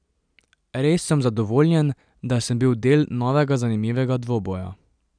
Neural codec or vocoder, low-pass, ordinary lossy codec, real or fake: none; 9.9 kHz; none; real